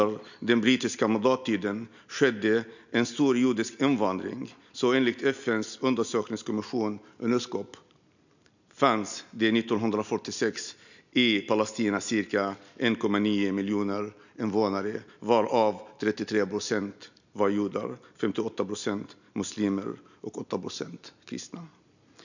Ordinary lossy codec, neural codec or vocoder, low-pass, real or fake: none; none; 7.2 kHz; real